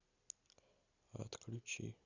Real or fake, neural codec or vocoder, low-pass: real; none; 7.2 kHz